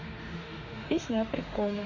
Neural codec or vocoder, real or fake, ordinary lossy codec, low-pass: autoencoder, 48 kHz, 32 numbers a frame, DAC-VAE, trained on Japanese speech; fake; Opus, 64 kbps; 7.2 kHz